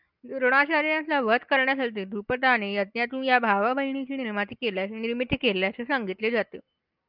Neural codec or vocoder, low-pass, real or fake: none; 5.4 kHz; real